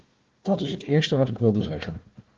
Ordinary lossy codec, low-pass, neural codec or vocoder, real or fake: Opus, 16 kbps; 7.2 kHz; codec, 16 kHz, 1 kbps, FunCodec, trained on Chinese and English, 50 frames a second; fake